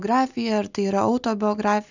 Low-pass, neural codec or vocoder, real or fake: 7.2 kHz; none; real